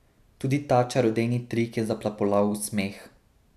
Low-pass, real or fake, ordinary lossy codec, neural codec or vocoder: 14.4 kHz; real; none; none